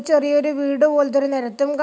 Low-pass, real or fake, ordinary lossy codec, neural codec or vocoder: none; real; none; none